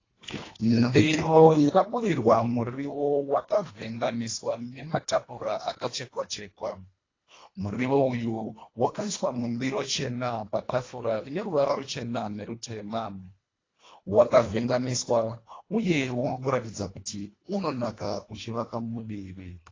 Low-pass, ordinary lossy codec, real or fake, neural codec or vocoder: 7.2 kHz; AAC, 32 kbps; fake; codec, 24 kHz, 1.5 kbps, HILCodec